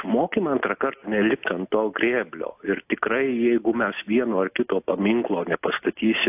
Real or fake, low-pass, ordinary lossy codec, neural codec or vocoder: real; 3.6 kHz; AAC, 32 kbps; none